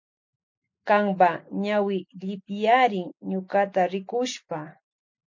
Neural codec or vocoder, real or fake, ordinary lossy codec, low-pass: none; real; MP3, 48 kbps; 7.2 kHz